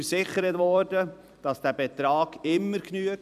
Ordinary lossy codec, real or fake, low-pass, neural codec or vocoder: none; fake; 14.4 kHz; vocoder, 44.1 kHz, 128 mel bands every 256 samples, BigVGAN v2